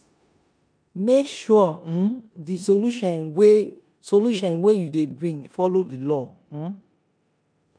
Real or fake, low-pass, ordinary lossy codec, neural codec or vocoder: fake; 9.9 kHz; none; codec, 16 kHz in and 24 kHz out, 0.9 kbps, LongCat-Audio-Codec, four codebook decoder